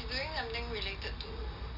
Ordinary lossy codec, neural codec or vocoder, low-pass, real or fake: none; none; 5.4 kHz; real